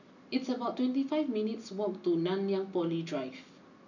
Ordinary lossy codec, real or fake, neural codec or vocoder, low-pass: none; real; none; 7.2 kHz